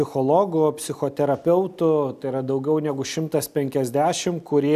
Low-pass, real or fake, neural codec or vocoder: 14.4 kHz; real; none